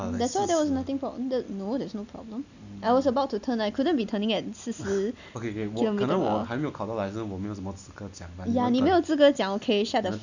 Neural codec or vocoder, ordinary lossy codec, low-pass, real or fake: none; none; 7.2 kHz; real